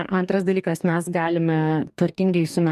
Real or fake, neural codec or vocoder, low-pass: fake; codec, 44.1 kHz, 2.6 kbps, DAC; 14.4 kHz